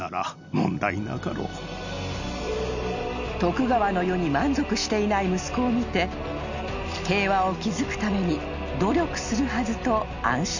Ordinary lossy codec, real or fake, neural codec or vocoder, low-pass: none; real; none; 7.2 kHz